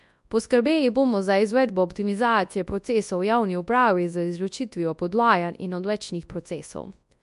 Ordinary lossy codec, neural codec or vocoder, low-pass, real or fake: MP3, 64 kbps; codec, 24 kHz, 0.9 kbps, WavTokenizer, large speech release; 10.8 kHz; fake